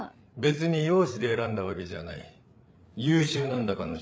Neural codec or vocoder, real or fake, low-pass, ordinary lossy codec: codec, 16 kHz, 8 kbps, FreqCodec, larger model; fake; none; none